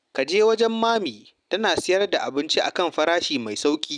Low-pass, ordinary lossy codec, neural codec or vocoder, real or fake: 9.9 kHz; none; none; real